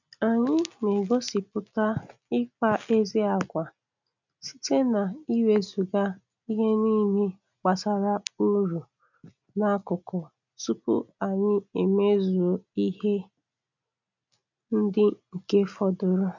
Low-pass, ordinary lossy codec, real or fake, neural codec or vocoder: 7.2 kHz; none; real; none